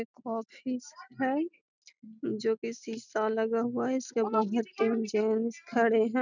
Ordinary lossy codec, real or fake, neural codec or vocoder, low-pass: none; real; none; 7.2 kHz